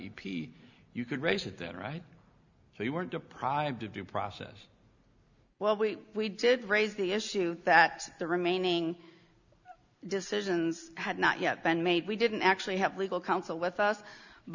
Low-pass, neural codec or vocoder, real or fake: 7.2 kHz; none; real